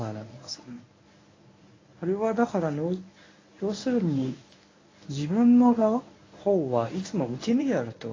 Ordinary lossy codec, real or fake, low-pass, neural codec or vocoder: AAC, 32 kbps; fake; 7.2 kHz; codec, 24 kHz, 0.9 kbps, WavTokenizer, medium speech release version 1